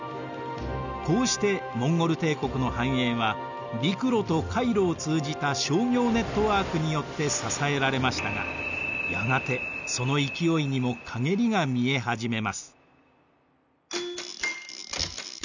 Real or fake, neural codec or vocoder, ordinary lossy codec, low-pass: real; none; none; 7.2 kHz